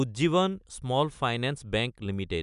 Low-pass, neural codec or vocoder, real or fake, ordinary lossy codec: 10.8 kHz; none; real; none